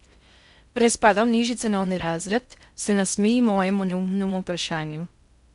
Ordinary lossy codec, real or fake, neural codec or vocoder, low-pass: MP3, 96 kbps; fake; codec, 16 kHz in and 24 kHz out, 0.6 kbps, FocalCodec, streaming, 4096 codes; 10.8 kHz